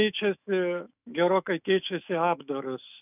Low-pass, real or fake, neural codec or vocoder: 3.6 kHz; fake; autoencoder, 48 kHz, 128 numbers a frame, DAC-VAE, trained on Japanese speech